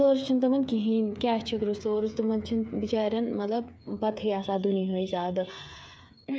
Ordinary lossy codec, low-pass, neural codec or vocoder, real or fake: none; none; codec, 16 kHz, 8 kbps, FreqCodec, smaller model; fake